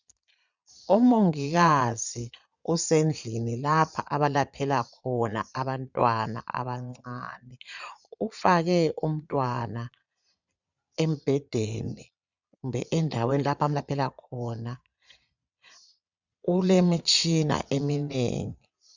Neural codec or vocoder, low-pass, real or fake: vocoder, 22.05 kHz, 80 mel bands, Vocos; 7.2 kHz; fake